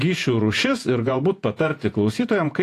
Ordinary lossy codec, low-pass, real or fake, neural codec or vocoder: AAC, 64 kbps; 14.4 kHz; fake; vocoder, 48 kHz, 128 mel bands, Vocos